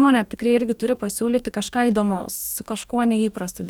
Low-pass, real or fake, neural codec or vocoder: 19.8 kHz; fake; codec, 44.1 kHz, 2.6 kbps, DAC